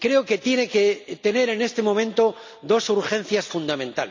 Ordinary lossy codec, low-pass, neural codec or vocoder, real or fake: MP3, 64 kbps; 7.2 kHz; none; real